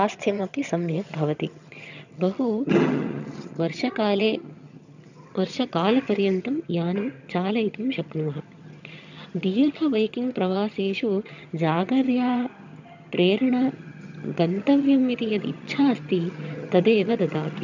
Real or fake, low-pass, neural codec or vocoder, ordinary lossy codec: fake; 7.2 kHz; vocoder, 22.05 kHz, 80 mel bands, HiFi-GAN; none